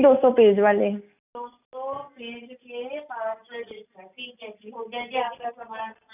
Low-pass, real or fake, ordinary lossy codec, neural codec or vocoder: 3.6 kHz; real; none; none